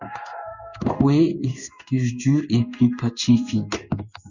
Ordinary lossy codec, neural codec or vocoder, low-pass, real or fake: Opus, 64 kbps; codec, 16 kHz in and 24 kHz out, 1 kbps, XY-Tokenizer; 7.2 kHz; fake